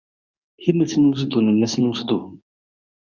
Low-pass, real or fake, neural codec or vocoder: 7.2 kHz; fake; codec, 24 kHz, 0.9 kbps, WavTokenizer, medium speech release version 2